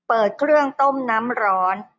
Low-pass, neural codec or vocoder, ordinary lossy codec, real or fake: none; none; none; real